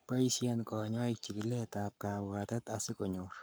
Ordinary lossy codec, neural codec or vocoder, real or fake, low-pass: none; codec, 44.1 kHz, 7.8 kbps, Pupu-Codec; fake; none